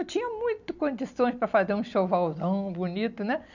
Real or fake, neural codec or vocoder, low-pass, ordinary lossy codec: real; none; 7.2 kHz; none